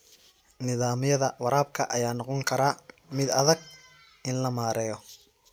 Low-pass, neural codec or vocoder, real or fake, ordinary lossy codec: none; none; real; none